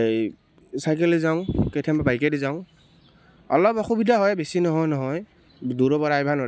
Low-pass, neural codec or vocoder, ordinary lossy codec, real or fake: none; none; none; real